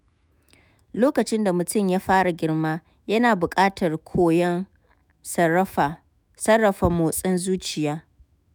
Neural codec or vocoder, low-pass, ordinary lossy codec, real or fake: autoencoder, 48 kHz, 128 numbers a frame, DAC-VAE, trained on Japanese speech; none; none; fake